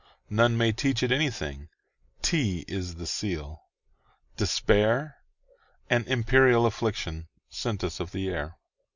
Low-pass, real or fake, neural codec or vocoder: 7.2 kHz; real; none